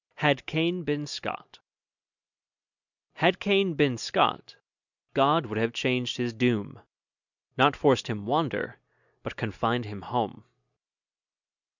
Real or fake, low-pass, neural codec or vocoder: real; 7.2 kHz; none